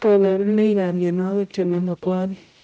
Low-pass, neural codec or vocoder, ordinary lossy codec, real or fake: none; codec, 16 kHz, 0.5 kbps, X-Codec, HuBERT features, trained on general audio; none; fake